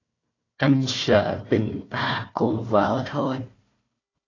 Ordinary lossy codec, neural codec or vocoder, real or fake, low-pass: AAC, 32 kbps; codec, 16 kHz, 1 kbps, FunCodec, trained on Chinese and English, 50 frames a second; fake; 7.2 kHz